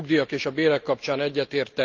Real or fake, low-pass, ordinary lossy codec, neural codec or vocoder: real; 7.2 kHz; Opus, 32 kbps; none